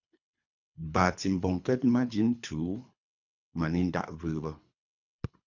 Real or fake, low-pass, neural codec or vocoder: fake; 7.2 kHz; codec, 24 kHz, 3 kbps, HILCodec